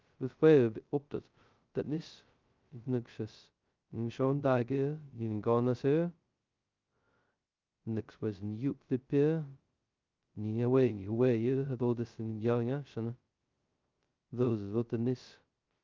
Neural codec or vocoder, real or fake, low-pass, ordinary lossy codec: codec, 16 kHz, 0.2 kbps, FocalCodec; fake; 7.2 kHz; Opus, 24 kbps